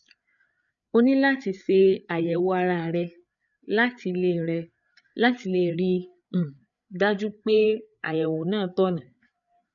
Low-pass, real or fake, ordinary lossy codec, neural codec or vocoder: 7.2 kHz; fake; none; codec, 16 kHz, 8 kbps, FreqCodec, larger model